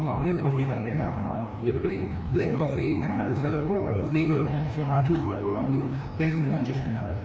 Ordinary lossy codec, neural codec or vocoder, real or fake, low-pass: none; codec, 16 kHz, 1 kbps, FreqCodec, larger model; fake; none